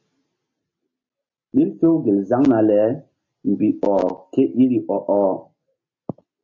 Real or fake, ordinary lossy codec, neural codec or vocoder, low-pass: real; MP3, 32 kbps; none; 7.2 kHz